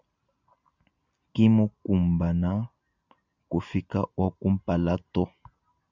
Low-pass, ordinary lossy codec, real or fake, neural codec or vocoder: 7.2 kHz; Opus, 64 kbps; real; none